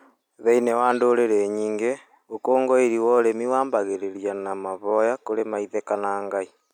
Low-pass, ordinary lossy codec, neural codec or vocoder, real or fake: 19.8 kHz; none; none; real